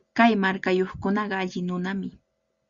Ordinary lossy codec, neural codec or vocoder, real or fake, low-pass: Opus, 64 kbps; none; real; 7.2 kHz